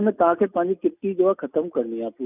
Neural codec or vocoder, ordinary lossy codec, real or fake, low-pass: none; none; real; 3.6 kHz